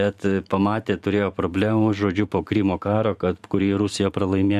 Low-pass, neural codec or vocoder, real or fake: 14.4 kHz; vocoder, 44.1 kHz, 128 mel bands every 512 samples, BigVGAN v2; fake